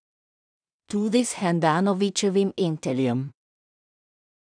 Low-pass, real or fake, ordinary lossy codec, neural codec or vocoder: 9.9 kHz; fake; none; codec, 16 kHz in and 24 kHz out, 0.4 kbps, LongCat-Audio-Codec, two codebook decoder